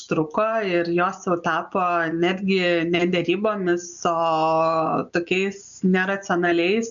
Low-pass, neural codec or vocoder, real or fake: 7.2 kHz; none; real